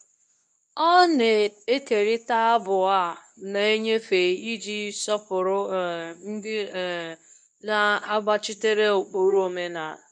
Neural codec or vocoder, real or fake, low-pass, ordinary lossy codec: codec, 24 kHz, 0.9 kbps, WavTokenizer, medium speech release version 2; fake; none; none